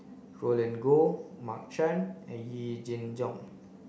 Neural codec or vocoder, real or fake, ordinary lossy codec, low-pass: none; real; none; none